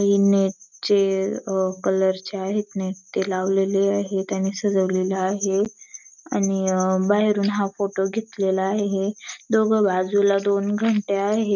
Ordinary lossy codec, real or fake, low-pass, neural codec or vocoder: none; real; 7.2 kHz; none